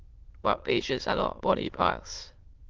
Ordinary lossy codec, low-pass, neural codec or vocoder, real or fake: Opus, 16 kbps; 7.2 kHz; autoencoder, 22.05 kHz, a latent of 192 numbers a frame, VITS, trained on many speakers; fake